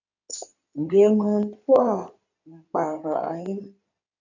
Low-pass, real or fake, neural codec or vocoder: 7.2 kHz; fake; codec, 16 kHz in and 24 kHz out, 2.2 kbps, FireRedTTS-2 codec